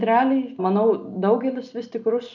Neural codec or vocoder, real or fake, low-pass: none; real; 7.2 kHz